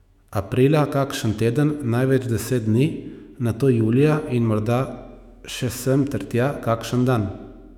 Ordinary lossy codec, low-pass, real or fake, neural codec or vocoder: none; 19.8 kHz; fake; autoencoder, 48 kHz, 128 numbers a frame, DAC-VAE, trained on Japanese speech